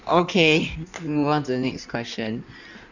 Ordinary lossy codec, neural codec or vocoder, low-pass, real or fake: none; codec, 16 kHz in and 24 kHz out, 1.1 kbps, FireRedTTS-2 codec; 7.2 kHz; fake